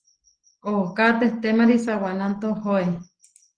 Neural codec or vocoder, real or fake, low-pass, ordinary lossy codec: none; real; 9.9 kHz; Opus, 16 kbps